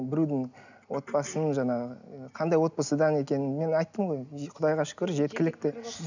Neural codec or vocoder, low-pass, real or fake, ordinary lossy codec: none; 7.2 kHz; real; none